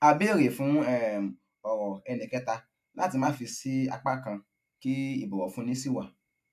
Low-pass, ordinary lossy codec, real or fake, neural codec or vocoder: 14.4 kHz; AAC, 96 kbps; real; none